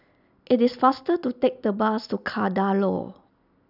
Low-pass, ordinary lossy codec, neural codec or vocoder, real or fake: 5.4 kHz; none; none; real